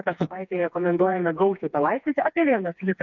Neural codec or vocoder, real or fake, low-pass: codec, 16 kHz, 2 kbps, FreqCodec, smaller model; fake; 7.2 kHz